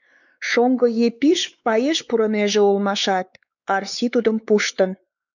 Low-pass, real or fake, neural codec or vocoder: 7.2 kHz; fake; codec, 16 kHz, 4 kbps, X-Codec, WavLM features, trained on Multilingual LibriSpeech